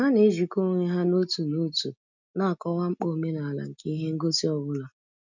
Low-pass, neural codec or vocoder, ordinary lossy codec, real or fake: 7.2 kHz; none; none; real